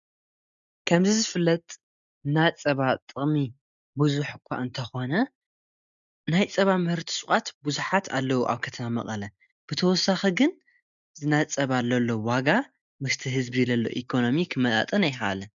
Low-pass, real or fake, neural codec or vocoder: 7.2 kHz; real; none